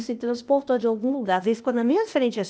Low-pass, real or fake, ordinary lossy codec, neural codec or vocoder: none; fake; none; codec, 16 kHz, 0.8 kbps, ZipCodec